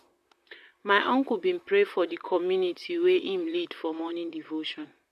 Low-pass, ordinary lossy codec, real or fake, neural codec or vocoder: 14.4 kHz; none; fake; vocoder, 44.1 kHz, 128 mel bands, Pupu-Vocoder